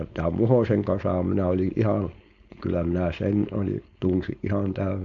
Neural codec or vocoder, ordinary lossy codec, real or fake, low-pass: codec, 16 kHz, 4.8 kbps, FACodec; none; fake; 7.2 kHz